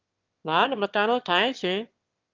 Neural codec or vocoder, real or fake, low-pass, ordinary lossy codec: autoencoder, 22.05 kHz, a latent of 192 numbers a frame, VITS, trained on one speaker; fake; 7.2 kHz; Opus, 32 kbps